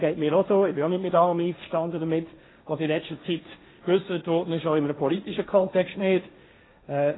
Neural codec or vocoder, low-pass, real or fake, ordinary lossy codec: codec, 16 kHz, 1.1 kbps, Voila-Tokenizer; 7.2 kHz; fake; AAC, 16 kbps